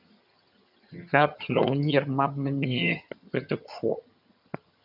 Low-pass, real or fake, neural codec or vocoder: 5.4 kHz; fake; vocoder, 22.05 kHz, 80 mel bands, HiFi-GAN